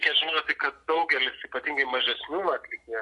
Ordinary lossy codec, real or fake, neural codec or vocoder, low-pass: AAC, 48 kbps; real; none; 10.8 kHz